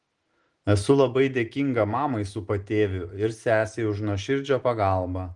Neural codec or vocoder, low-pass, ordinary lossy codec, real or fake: none; 10.8 kHz; Opus, 24 kbps; real